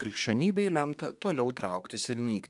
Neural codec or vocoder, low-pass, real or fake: codec, 24 kHz, 1 kbps, SNAC; 10.8 kHz; fake